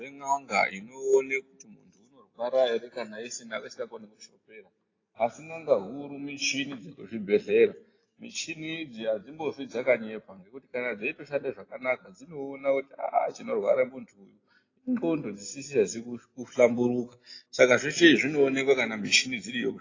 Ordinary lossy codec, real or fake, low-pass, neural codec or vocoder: AAC, 32 kbps; real; 7.2 kHz; none